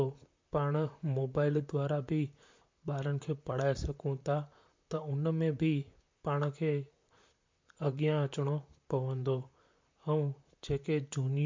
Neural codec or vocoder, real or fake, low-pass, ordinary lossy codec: vocoder, 44.1 kHz, 128 mel bands, Pupu-Vocoder; fake; 7.2 kHz; MP3, 48 kbps